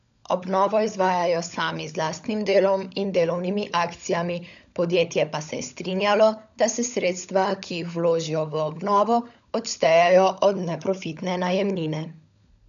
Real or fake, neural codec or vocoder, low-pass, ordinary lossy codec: fake; codec, 16 kHz, 16 kbps, FunCodec, trained on LibriTTS, 50 frames a second; 7.2 kHz; none